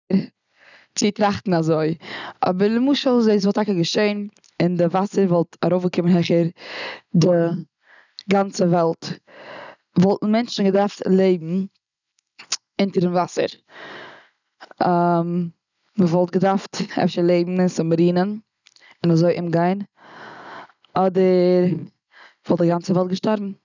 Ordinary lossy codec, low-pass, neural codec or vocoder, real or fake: none; 7.2 kHz; none; real